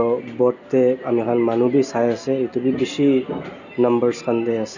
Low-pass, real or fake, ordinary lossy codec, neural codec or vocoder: 7.2 kHz; real; none; none